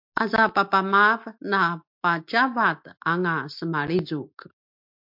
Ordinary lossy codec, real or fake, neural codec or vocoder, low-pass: MP3, 48 kbps; real; none; 5.4 kHz